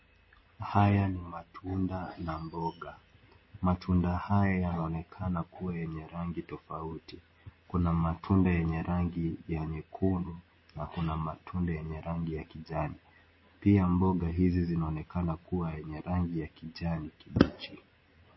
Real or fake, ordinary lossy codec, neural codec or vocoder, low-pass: real; MP3, 24 kbps; none; 7.2 kHz